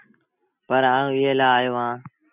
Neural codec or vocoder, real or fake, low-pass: none; real; 3.6 kHz